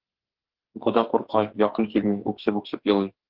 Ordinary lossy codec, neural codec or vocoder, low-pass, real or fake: Opus, 16 kbps; codec, 44.1 kHz, 2.6 kbps, SNAC; 5.4 kHz; fake